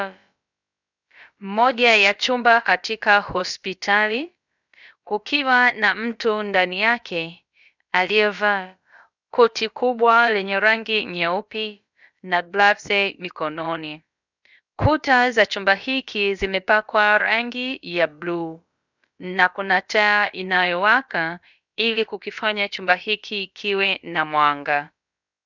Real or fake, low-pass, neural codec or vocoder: fake; 7.2 kHz; codec, 16 kHz, about 1 kbps, DyCAST, with the encoder's durations